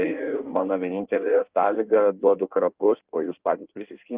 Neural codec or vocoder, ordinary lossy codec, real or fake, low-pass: codec, 16 kHz in and 24 kHz out, 1.1 kbps, FireRedTTS-2 codec; Opus, 32 kbps; fake; 3.6 kHz